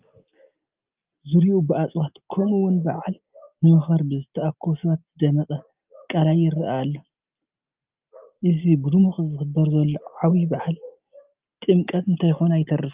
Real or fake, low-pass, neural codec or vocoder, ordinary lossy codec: real; 3.6 kHz; none; Opus, 24 kbps